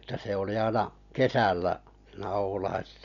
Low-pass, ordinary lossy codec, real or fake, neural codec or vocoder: 7.2 kHz; none; real; none